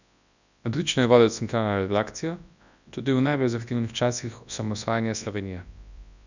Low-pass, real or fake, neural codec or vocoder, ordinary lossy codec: 7.2 kHz; fake; codec, 24 kHz, 0.9 kbps, WavTokenizer, large speech release; none